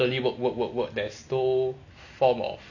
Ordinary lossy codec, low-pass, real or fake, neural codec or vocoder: none; 7.2 kHz; real; none